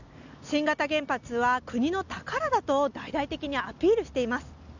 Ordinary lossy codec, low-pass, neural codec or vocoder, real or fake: none; 7.2 kHz; none; real